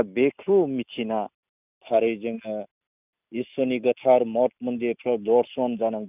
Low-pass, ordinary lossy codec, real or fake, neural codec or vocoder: 3.6 kHz; none; real; none